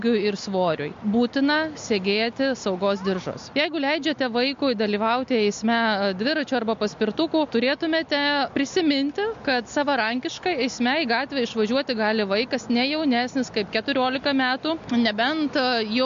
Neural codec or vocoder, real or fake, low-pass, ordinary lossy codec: none; real; 7.2 kHz; MP3, 48 kbps